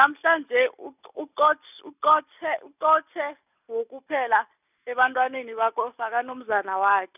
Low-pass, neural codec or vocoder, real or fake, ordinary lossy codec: 3.6 kHz; none; real; none